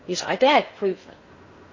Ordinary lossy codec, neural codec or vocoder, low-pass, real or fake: MP3, 32 kbps; codec, 16 kHz in and 24 kHz out, 0.6 kbps, FocalCodec, streaming, 4096 codes; 7.2 kHz; fake